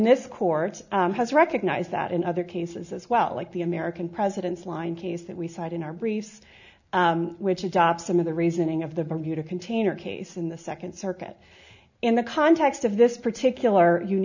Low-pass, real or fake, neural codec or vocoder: 7.2 kHz; real; none